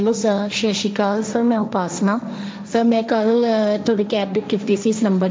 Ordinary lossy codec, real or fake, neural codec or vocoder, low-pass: none; fake; codec, 16 kHz, 1.1 kbps, Voila-Tokenizer; none